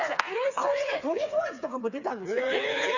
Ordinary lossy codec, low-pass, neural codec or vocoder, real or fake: none; 7.2 kHz; codec, 16 kHz, 4 kbps, FreqCodec, smaller model; fake